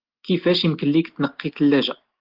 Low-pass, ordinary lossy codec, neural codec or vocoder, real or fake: 5.4 kHz; Opus, 16 kbps; none; real